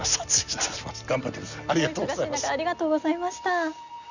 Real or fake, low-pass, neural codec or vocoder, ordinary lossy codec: real; 7.2 kHz; none; none